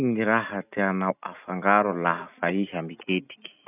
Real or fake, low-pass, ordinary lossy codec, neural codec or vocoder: real; 3.6 kHz; none; none